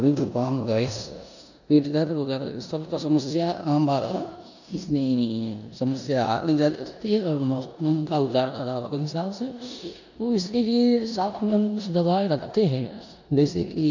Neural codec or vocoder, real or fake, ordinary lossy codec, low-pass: codec, 16 kHz in and 24 kHz out, 0.9 kbps, LongCat-Audio-Codec, four codebook decoder; fake; none; 7.2 kHz